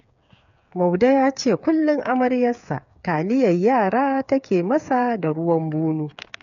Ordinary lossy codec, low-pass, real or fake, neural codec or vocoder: none; 7.2 kHz; fake; codec, 16 kHz, 8 kbps, FreqCodec, smaller model